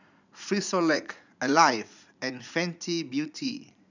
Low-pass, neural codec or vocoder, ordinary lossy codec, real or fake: 7.2 kHz; vocoder, 44.1 kHz, 80 mel bands, Vocos; none; fake